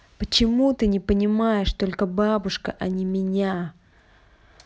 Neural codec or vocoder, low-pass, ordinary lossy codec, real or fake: none; none; none; real